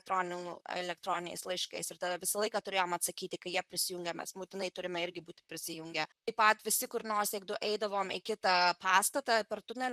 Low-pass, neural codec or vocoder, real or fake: 14.4 kHz; vocoder, 44.1 kHz, 128 mel bands, Pupu-Vocoder; fake